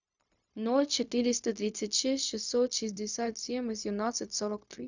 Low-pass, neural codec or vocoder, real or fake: 7.2 kHz; codec, 16 kHz, 0.4 kbps, LongCat-Audio-Codec; fake